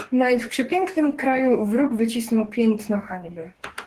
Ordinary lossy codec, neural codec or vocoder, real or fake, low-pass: Opus, 16 kbps; codec, 44.1 kHz, 2.6 kbps, DAC; fake; 14.4 kHz